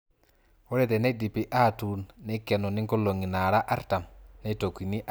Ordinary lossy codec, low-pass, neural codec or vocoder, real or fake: none; none; none; real